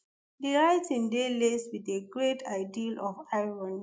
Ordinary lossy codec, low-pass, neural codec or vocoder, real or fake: none; none; none; real